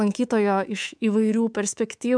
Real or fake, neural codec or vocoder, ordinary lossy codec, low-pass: fake; codec, 24 kHz, 3.1 kbps, DualCodec; MP3, 96 kbps; 9.9 kHz